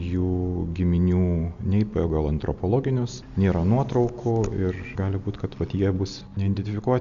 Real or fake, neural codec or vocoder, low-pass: real; none; 7.2 kHz